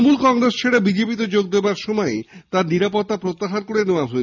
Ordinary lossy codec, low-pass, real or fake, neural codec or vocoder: none; 7.2 kHz; real; none